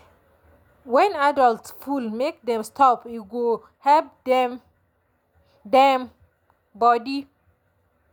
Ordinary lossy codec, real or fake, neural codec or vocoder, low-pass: none; real; none; none